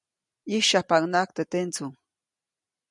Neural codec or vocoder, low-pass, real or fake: none; 10.8 kHz; real